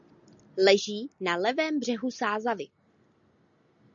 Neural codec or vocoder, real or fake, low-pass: none; real; 7.2 kHz